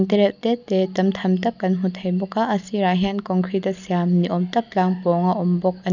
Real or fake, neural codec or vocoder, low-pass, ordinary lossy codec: real; none; 7.2 kHz; none